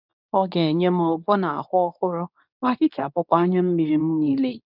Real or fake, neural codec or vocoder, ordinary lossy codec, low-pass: fake; codec, 24 kHz, 0.9 kbps, WavTokenizer, medium speech release version 2; none; 5.4 kHz